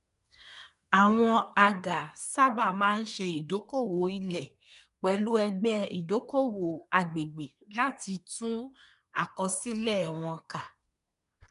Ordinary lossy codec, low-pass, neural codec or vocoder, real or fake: MP3, 96 kbps; 10.8 kHz; codec, 24 kHz, 1 kbps, SNAC; fake